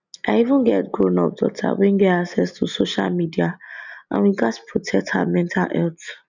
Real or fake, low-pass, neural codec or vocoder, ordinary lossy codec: real; 7.2 kHz; none; none